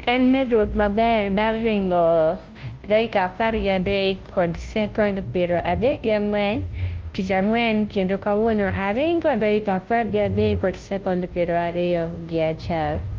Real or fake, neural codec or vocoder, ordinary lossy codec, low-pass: fake; codec, 16 kHz, 0.5 kbps, FunCodec, trained on Chinese and English, 25 frames a second; Opus, 32 kbps; 7.2 kHz